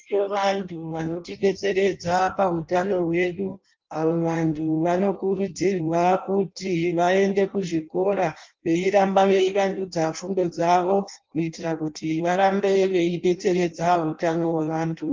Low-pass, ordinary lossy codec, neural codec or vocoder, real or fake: 7.2 kHz; Opus, 24 kbps; codec, 16 kHz in and 24 kHz out, 0.6 kbps, FireRedTTS-2 codec; fake